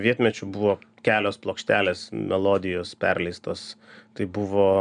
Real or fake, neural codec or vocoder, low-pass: real; none; 9.9 kHz